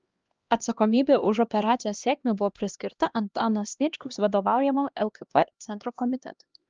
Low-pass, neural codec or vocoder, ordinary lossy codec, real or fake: 7.2 kHz; codec, 16 kHz, 1 kbps, X-Codec, HuBERT features, trained on LibriSpeech; Opus, 32 kbps; fake